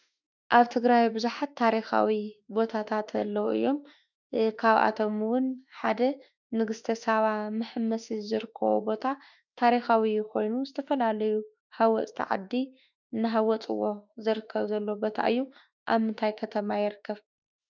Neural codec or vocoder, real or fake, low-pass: autoencoder, 48 kHz, 32 numbers a frame, DAC-VAE, trained on Japanese speech; fake; 7.2 kHz